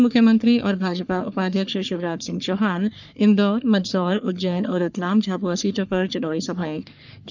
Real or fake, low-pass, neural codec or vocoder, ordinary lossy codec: fake; 7.2 kHz; codec, 44.1 kHz, 3.4 kbps, Pupu-Codec; none